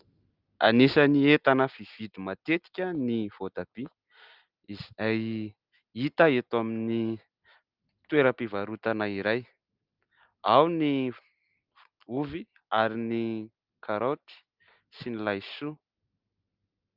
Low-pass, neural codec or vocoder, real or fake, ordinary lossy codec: 5.4 kHz; none; real; Opus, 24 kbps